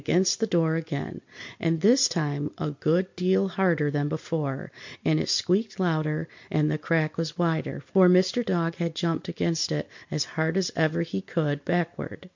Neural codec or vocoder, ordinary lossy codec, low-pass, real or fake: none; MP3, 48 kbps; 7.2 kHz; real